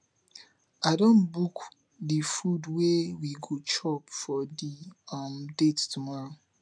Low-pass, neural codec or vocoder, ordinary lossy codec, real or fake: 9.9 kHz; none; none; real